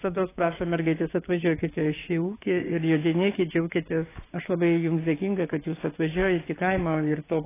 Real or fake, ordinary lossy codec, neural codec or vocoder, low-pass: fake; AAC, 16 kbps; codec, 16 kHz, 6 kbps, DAC; 3.6 kHz